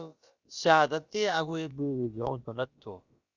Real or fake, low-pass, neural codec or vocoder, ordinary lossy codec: fake; 7.2 kHz; codec, 16 kHz, about 1 kbps, DyCAST, with the encoder's durations; Opus, 64 kbps